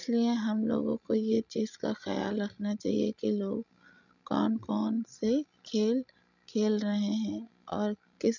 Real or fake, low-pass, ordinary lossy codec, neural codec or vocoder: fake; 7.2 kHz; none; codec, 16 kHz, 16 kbps, FreqCodec, larger model